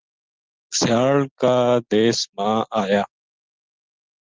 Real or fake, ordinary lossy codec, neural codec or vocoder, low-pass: real; Opus, 16 kbps; none; 7.2 kHz